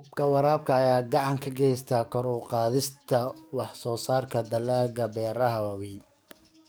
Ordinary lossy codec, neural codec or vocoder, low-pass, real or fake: none; codec, 44.1 kHz, 7.8 kbps, DAC; none; fake